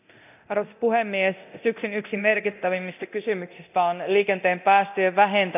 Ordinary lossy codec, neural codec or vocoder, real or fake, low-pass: none; codec, 24 kHz, 0.9 kbps, DualCodec; fake; 3.6 kHz